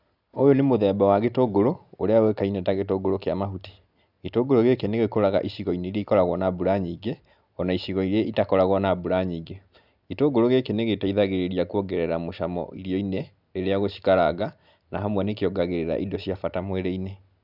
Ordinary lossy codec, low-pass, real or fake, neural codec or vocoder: none; 5.4 kHz; real; none